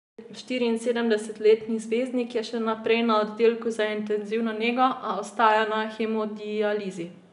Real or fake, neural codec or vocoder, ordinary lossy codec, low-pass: real; none; none; 10.8 kHz